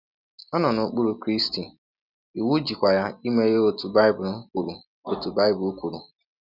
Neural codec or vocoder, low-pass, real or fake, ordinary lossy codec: none; 5.4 kHz; real; none